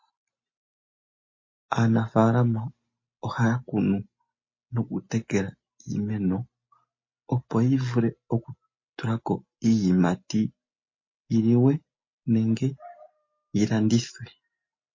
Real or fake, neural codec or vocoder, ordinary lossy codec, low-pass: real; none; MP3, 32 kbps; 7.2 kHz